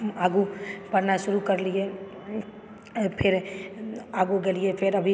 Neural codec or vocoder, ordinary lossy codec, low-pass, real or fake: none; none; none; real